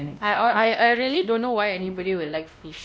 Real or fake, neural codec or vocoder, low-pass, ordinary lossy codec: fake; codec, 16 kHz, 1 kbps, X-Codec, WavLM features, trained on Multilingual LibriSpeech; none; none